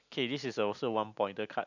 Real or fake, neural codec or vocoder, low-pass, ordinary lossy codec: real; none; 7.2 kHz; none